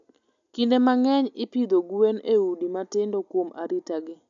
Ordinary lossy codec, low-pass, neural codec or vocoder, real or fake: none; 7.2 kHz; none; real